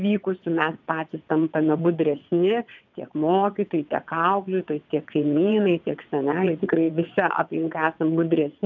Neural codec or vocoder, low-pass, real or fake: vocoder, 22.05 kHz, 80 mel bands, WaveNeXt; 7.2 kHz; fake